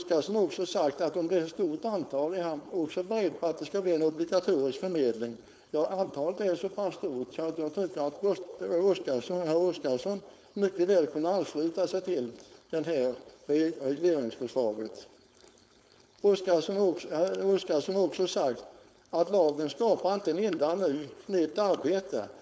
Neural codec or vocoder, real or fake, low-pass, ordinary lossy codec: codec, 16 kHz, 4.8 kbps, FACodec; fake; none; none